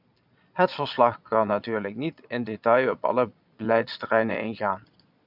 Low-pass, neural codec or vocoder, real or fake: 5.4 kHz; vocoder, 22.05 kHz, 80 mel bands, Vocos; fake